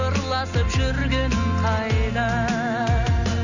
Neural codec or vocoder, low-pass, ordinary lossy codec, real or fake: none; 7.2 kHz; none; real